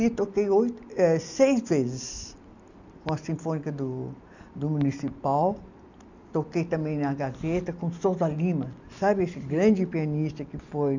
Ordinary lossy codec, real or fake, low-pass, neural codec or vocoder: none; real; 7.2 kHz; none